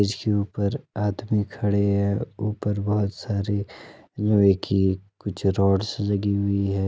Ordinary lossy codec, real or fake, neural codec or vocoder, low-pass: none; real; none; none